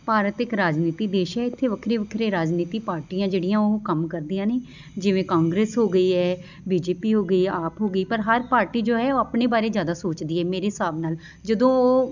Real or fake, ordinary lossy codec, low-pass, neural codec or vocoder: real; none; 7.2 kHz; none